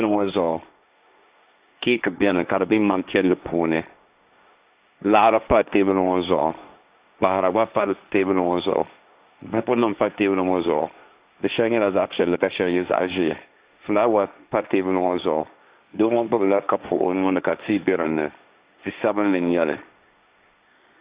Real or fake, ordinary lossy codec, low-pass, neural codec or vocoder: fake; Opus, 64 kbps; 3.6 kHz; codec, 16 kHz, 1.1 kbps, Voila-Tokenizer